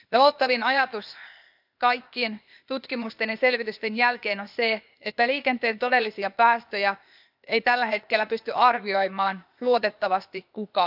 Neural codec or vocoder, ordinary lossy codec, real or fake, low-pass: codec, 16 kHz, 0.8 kbps, ZipCodec; none; fake; 5.4 kHz